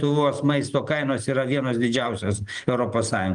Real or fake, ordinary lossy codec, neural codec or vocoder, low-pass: real; Opus, 24 kbps; none; 9.9 kHz